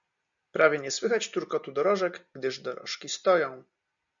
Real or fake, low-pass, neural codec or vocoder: real; 7.2 kHz; none